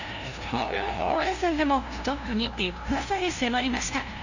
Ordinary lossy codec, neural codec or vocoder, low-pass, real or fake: none; codec, 16 kHz, 0.5 kbps, FunCodec, trained on LibriTTS, 25 frames a second; 7.2 kHz; fake